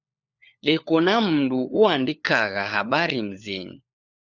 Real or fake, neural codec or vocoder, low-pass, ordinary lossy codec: fake; codec, 16 kHz, 4 kbps, FunCodec, trained on LibriTTS, 50 frames a second; 7.2 kHz; Opus, 64 kbps